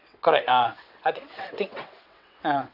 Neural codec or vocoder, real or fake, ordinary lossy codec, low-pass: vocoder, 22.05 kHz, 80 mel bands, WaveNeXt; fake; none; 5.4 kHz